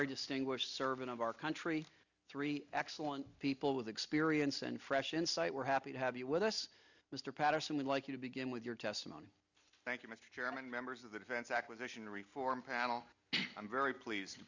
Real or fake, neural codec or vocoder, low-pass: real; none; 7.2 kHz